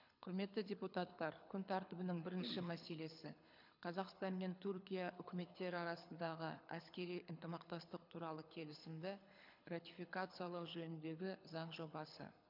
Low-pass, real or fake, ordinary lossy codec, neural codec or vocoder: 5.4 kHz; fake; MP3, 48 kbps; codec, 24 kHz, 6 kbps, HILCodec